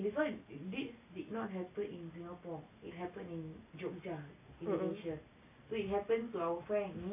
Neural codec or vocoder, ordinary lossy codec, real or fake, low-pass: none; AAC, 24 kbps; real; 3.6 kHz